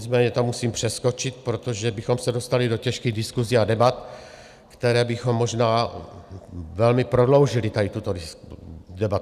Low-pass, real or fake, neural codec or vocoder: 14.4 kHz; real; none